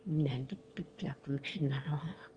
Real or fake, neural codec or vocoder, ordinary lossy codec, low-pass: fake; autoencoder, 22.05 kHz, a latent of 192 numbers a frame, VITS, trained on one speaker; Opus, 32 kbps; 9.9 kHz